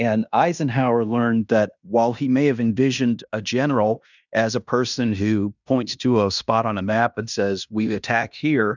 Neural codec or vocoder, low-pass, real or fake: codec, 16 kHz in and 24 kHz out, 0.9 kbps, LongCat-Audio-Codec, fine tuned four codebook decoder; 7.2 kHz; fake